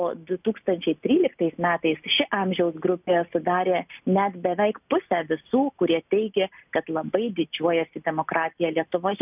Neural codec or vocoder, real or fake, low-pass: none; real; 3.6 kHz